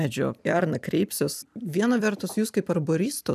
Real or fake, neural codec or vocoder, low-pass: fake; vocoder, 44.1 kHz, 128 mel bands every 256 samples, BigVGAN v2; 14.4 kHz